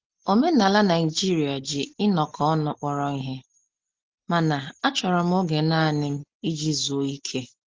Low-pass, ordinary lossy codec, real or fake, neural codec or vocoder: 7.2 kHz; Opus, 16 kbps; real; none